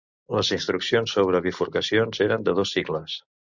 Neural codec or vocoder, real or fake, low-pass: none; real; 7.2 kHz